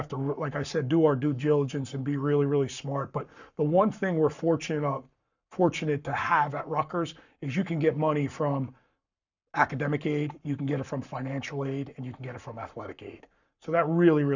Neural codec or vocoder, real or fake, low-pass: codec, 44.1 kHz, 7.8 kbps, Pupu-Codec; fake; 7.2 kHz